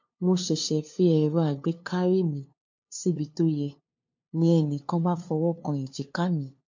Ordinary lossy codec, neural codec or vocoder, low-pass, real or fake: MP3, 48 kbps; codec, 16 kHz, 2 kbps, FunCodec, trained on LibriTTS, 25 frames a second; 7.2 kHz; fake